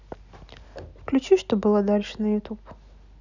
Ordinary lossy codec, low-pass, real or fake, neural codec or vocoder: none; 7.2 kHz; real; none